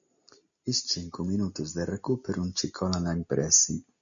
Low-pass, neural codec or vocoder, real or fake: 7.2 kHz; none; real